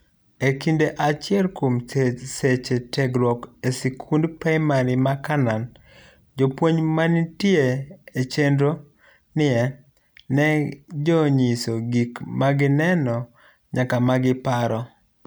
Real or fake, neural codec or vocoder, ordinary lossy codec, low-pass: real; none; none; none